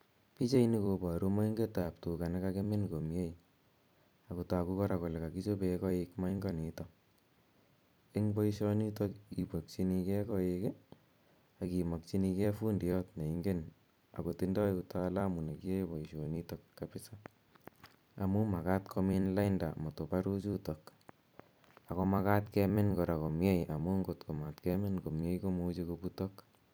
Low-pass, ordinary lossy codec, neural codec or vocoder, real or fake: none; none; vocoder, 44.1 kHz, 128 mel bands every 256 samples, BigVGAN v2; fake